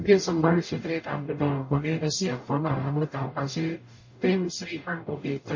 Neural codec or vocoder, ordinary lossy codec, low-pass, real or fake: codec, 44.1 kHz, 0.9 kbps, DAC; MP3, 32 kbps; 7.2 kHz; fake